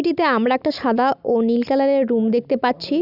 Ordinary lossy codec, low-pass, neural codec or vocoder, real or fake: none; 5.4 kHz; none; real